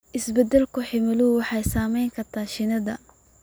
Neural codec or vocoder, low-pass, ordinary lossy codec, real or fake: none; none; none; real